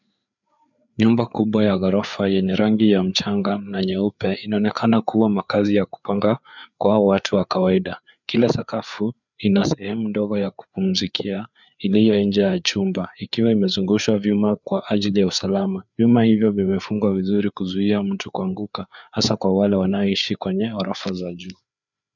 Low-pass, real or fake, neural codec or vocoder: 7.2 kHz; fake; codec, 16 kHz, 4 kbps, FreqCodec, larger model